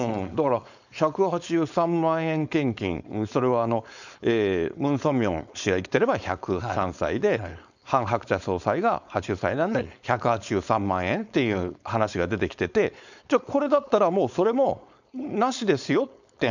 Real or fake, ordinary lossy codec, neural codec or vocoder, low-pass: fake; none; codec, 16 kHz, 4.8 kbps, FACodec; 7.2 kHz